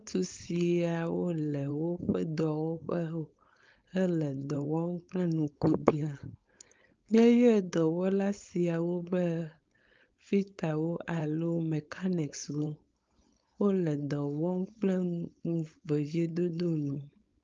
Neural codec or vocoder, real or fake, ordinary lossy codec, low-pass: codec, 16 kHz, 4.8 kbps, FACodec; fake; Opus, 24 kbps; 7.2 kHz